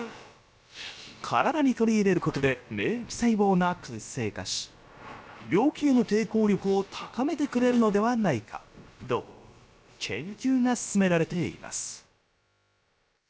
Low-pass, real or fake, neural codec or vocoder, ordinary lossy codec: none; fake; codec, 16 kHz, about 1 kbps, DyCAST, with the encoder's durations; none